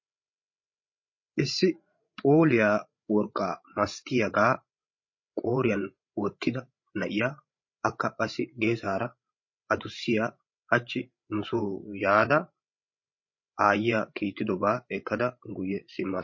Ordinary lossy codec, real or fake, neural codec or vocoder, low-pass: MP3, 32 kbps; fake; codec, 16 kHz, 16 kbps, FreqCodec, larger model; 7.2 kHz